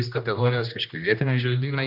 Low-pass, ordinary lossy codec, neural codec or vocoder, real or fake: 5.4 kHz; AAC, 48 kbps; codec, 16 kHz, 1 kbps, X-Codec, HuBERT features, trained on general audio; fake